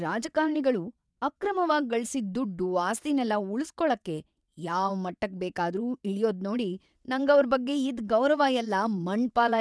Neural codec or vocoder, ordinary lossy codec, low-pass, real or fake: vocoder, 22.05 kHz, 80 mel bands, WaveNeXt; none; none; fake